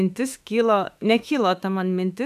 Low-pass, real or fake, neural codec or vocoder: 14.4 kHz; fake; autoencoder, 48 kHz, 32 numbers a frame, DAC-VAE, trained on Japanese speech